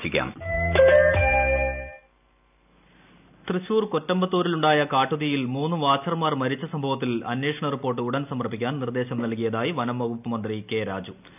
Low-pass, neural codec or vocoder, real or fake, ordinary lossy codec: 3.6 kHz; none; real; none